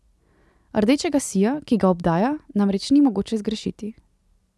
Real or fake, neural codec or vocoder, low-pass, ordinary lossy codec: real; none; none; none